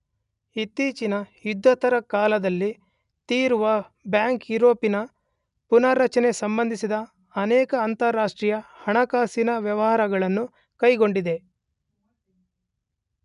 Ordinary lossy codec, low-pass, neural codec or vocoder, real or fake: none; 10.8 kHz; none; real